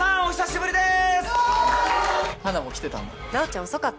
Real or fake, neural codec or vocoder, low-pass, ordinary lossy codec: real; none; none; none